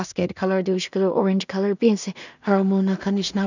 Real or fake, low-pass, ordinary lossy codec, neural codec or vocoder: fake; 7.2 kHz; none; codec, 16 kHz in and 24 kHz out, 0.4 kbps, LongCat-Audio-Codec, two codebook decoder